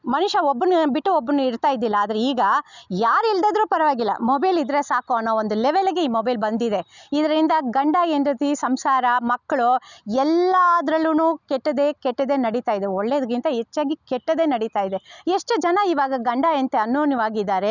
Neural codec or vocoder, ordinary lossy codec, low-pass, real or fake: none; none; 7.2 kHz; real